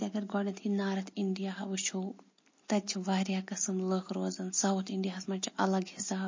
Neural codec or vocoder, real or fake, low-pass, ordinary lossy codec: none; real; 7.2 kHz; MP3, 32 kbps